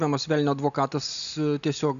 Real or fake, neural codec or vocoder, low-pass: real; none; 7.2 kHz